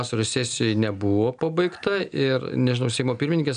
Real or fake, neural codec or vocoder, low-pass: real; none; 9.9 kHz